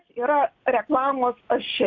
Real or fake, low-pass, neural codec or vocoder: real; 7.2 kHz; none